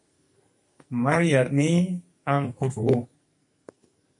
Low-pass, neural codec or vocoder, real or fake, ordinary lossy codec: 10.8 kHz; codec, 44.1 kHz, 2.6 kbps, SNAC; fake; MP3, 48 kbps